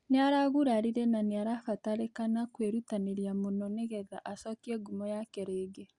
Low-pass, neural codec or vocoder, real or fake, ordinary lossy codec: 10.8 kHz; none; real; Opus, 64 kbps